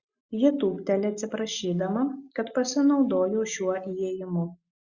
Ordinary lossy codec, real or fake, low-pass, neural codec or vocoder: Opus, 64 kbps; real; 7.2 kHz; none